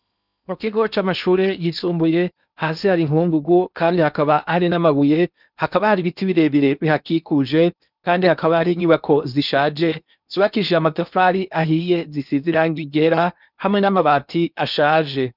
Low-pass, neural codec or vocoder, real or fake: 5.4 kHz; codec, 16 kHz in and 24 kHz out, 0.8 kbps, FocalCodec, streaming, 65536 codes; fake